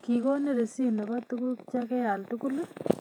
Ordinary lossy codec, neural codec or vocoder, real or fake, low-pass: none; vocoder, 48 kHz, 128 mel bands, Vocos; fake; 19.8 kHz